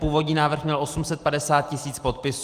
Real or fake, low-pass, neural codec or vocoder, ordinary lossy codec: real; 14.4 kHz; none; Opus, 24 kbps